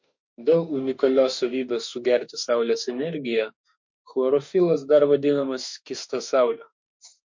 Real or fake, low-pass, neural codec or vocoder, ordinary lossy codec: fake; 7.2 kHz; autoencoder, 48 kHz, 32 numbers a frame, DAC-VAE, trained on Japanese speech; MP3, 48 kbps